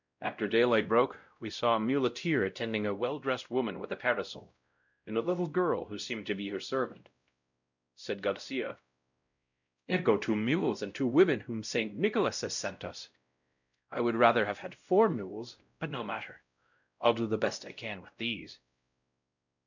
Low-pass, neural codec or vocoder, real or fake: 7.2 kHz; codec, 16 kHz, 0.5 kbps, X-Codec, WavLM features, trained on Multilingual LibriSpeech; fake